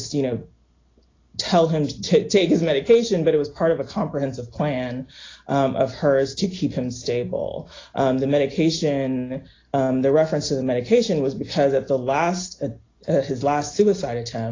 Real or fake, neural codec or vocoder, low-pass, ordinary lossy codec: real; none; 7.2 kHz; AAC, 32 kbps